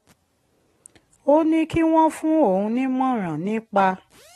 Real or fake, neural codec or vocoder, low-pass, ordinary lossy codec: real; none; 19.8 kHz; AAC, 32 kbps